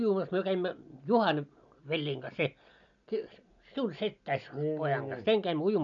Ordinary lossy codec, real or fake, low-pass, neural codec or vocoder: none; real; 7.2 kHz; none